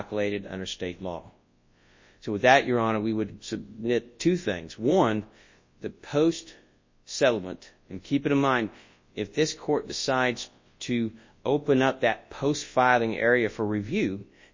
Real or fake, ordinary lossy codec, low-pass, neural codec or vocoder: fake; MP3, 32 kbps; 7.2 kHz; codec, 24 kHz, 0.9 kbps, WavTokenizer, large speech release